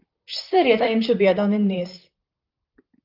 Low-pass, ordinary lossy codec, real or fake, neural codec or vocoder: 5.4 kHz; Opus, 24 kbps; fake; vocoder, 44.1 kHz, 128 mel bands, Pupu-Vocoder